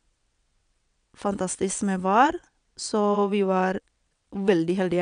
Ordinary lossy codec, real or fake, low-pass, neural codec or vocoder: none; fake; 9.9 kHz; vocoder, 22.05 kHz, 80 mel bands, WaveNeXt